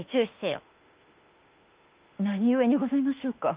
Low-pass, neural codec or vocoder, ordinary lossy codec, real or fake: 3.6 kHz; autoencoder, 48 kHz, 32 numbers a frame, DAC-VAE, trained on Japanese speech; Opus, 24 kbps; fake